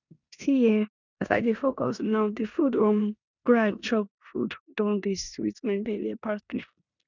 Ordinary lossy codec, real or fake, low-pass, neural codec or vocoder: none; fake; 7.2 kHz; codec, 16 kHz in and 24 kHz out, 0.9 kbps, LongCat-Audio-Codec, four codebook decoder